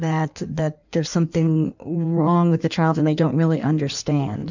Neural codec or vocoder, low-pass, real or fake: codec, 16 kHz in and 24 kHz out, 1.1 kbps, FireRedTTS-2 codec; 7.2 kHz; fake